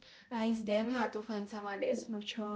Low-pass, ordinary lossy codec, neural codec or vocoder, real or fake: none; none; codec, 16 kHz, 0.5 kbps, X-Codec, HuBERT features, trained on balanced general audio; fake